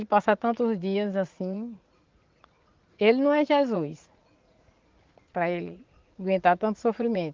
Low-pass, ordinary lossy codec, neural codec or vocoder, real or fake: 7.2 kHz; Opus, 32 kbps; vocoder, 44.1 kHz, 80 mel bands, Vocos; fake